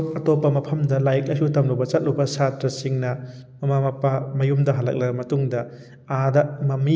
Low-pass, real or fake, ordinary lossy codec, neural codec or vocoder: none; real; none; none